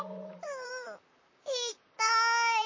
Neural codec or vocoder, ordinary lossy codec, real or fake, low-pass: vocoder, 44.1 kHz, 128 mel bands, Pupu-Vocoder; MP3, 32 kbps; fake; 7.2 kHz